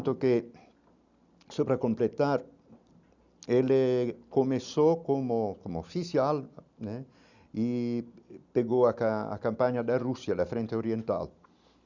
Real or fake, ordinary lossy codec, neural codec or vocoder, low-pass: real; none; none; 7.2 kHz